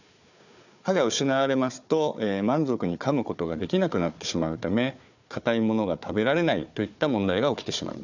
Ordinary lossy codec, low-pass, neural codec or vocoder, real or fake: none; 7.2 kHz; codec, 16 kHz, 4 kbps, FunCodec, trained on Chinese and English, 50 frames a second; fake